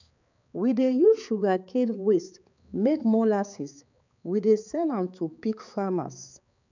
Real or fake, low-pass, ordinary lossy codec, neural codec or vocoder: fake; 7.2 kHz; none; codec, 16 kHz, 4 kbps, X-Codec, HuBERT features, trained on balanced general audio